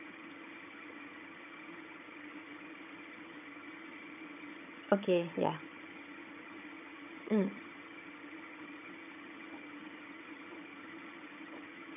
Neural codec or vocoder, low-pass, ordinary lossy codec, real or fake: vocoder, 22.05 kHz, 80 mel bands, HiFi-GAN; 3.6 kHz; none; fake